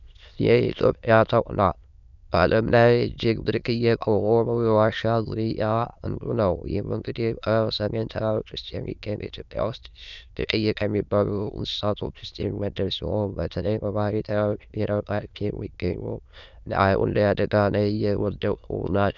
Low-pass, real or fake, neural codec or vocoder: 7.2 kHz; fake; autoencoder, 22.05 kHz, a latent of 192 numbers a frame, VITS, trained on many speakers